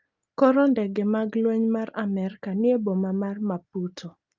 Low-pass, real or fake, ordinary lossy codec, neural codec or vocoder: 7.2 kHz; real; Opus, 24 kbps; none